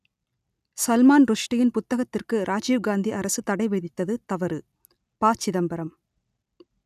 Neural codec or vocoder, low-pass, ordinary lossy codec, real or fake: none; 14.4 kHz; none; real